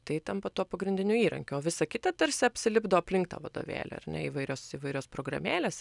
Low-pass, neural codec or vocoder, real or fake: 10.8 kHz; none; real